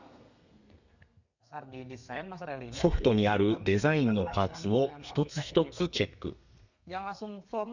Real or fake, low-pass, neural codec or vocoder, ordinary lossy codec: fake; 7.2 kHz; codec, 32 kHz, 1.9 kbps, SNAC; none